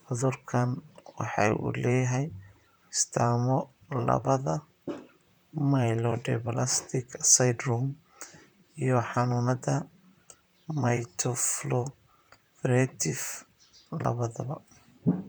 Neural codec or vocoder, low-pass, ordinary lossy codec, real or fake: vocoder, 44.1 kHz, 128 mel bands, Pupu-Vocoder; none; none; fake